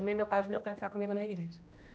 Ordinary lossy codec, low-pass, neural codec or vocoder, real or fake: none; none; codec, 16 kHz, 1 kbps, X-Codec, HuBERT features, trained on general audio; fake